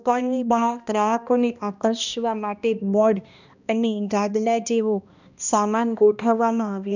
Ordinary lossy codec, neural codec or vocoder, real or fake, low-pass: none; codec, 16 kHz, 1 kbps, X-Codec, HuBERT features, trained on balanced general audio; fake; 7.2 kHz